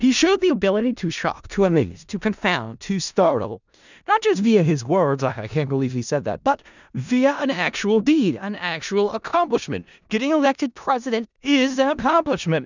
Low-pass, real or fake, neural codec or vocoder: 7.2 kHz; fake; codec, 16 kHz in and 24 kHz out, 0.4 kbps, LongCat-Audio-Codec, four codebook decoder